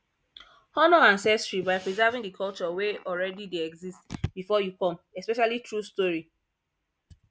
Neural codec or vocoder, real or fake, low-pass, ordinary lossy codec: none; real; none; none